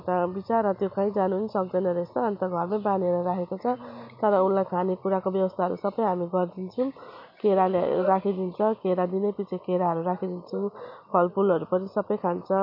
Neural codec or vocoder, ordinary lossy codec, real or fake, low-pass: none; MP3, 32 kbps; real; 5.4 kHz